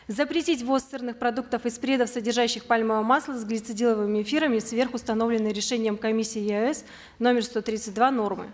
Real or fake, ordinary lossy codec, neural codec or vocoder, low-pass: real; none; none; none